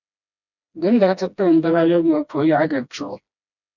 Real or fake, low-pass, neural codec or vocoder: fake; 7.2 kHz; codec, 16 kHz, 1 kbps, FreqCodec, smaller model